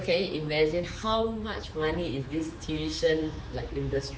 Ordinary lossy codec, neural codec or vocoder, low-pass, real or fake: none; codec, 16 kHz, 4 kbps, X-Codec, HuBERT features, trained on general audio; none; fake